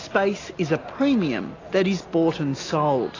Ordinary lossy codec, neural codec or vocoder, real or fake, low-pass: AAC, 32 kbps; none; real; 7.2 kHz